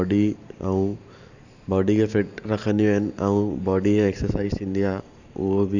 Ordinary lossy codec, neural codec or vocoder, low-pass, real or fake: none; vocoder, 44.1 kHz, 128 mel bands every 512 samples, BigVGAN v2; 7.2 kHz; fake